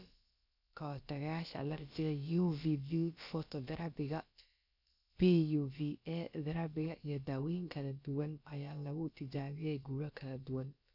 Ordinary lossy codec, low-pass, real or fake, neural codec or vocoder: none; 5.4 kHz; fake; codec, 16 kHz, about 1 kbps, DyCAST, with the encoder's durations